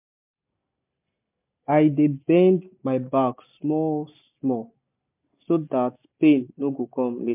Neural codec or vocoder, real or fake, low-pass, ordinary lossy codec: none; real; 3.6 kHz; MP3, 32 kbps